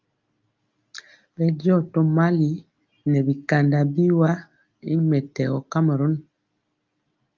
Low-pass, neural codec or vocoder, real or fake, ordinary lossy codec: 7.2 kHz; none; real; Opus, 32 kbps